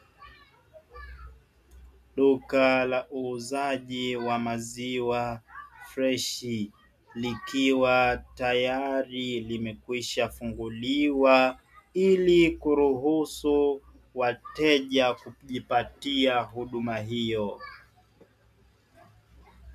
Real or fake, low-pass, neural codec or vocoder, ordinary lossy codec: real; 14.4 kHz; none; MP3, 96 kbps